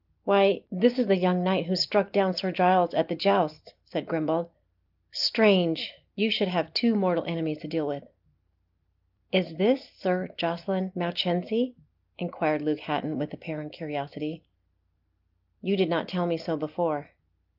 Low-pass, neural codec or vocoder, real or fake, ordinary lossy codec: 5.4 kHz; none; real; Opus, 32 kbps